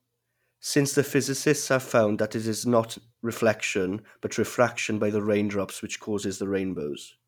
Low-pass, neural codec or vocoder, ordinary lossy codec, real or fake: 19.8 kHz; none; none; real